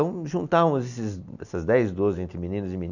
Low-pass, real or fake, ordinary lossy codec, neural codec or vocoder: 7.2 kHz; real; none; none